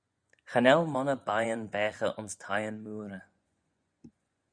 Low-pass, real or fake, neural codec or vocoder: 9.9 kHz; fake; vocoder, 24 kHz, 100 mel bands, Vocos